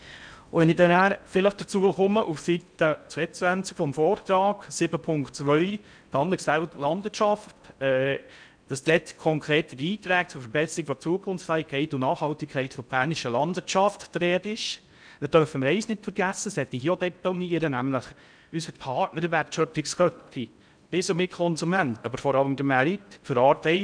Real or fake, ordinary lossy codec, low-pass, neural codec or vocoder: fake; none; 9.9 kHz; codec, 16 kHz in and 24 kHz out, 0.6 kbps, FocalCodec, streaming, 4096 codes